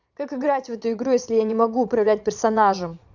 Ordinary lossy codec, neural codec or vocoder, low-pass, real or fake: none; vocoder, 22.05 kHz, 80 mel bands, Vocos; 7.2 kHz; fake